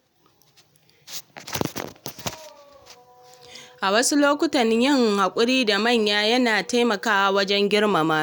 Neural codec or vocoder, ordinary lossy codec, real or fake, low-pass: none; none; real; none